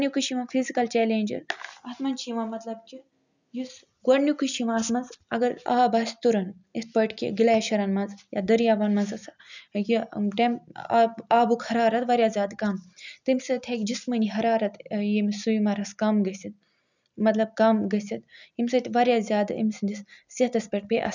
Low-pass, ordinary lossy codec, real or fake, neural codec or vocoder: 7.2 kHz; none; real; none